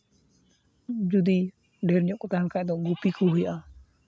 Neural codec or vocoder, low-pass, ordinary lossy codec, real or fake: none; none; none; real